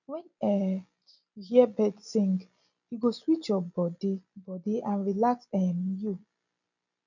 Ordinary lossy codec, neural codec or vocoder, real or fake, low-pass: none; none; real; 7.2 kHz